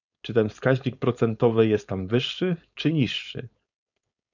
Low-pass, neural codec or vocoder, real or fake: 7.2 kHz; codec, 16 kHz, 4.8 kbps, FACodec; fake